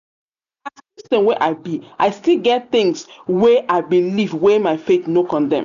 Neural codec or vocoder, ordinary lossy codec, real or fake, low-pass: none; none; real; 7.2 kHz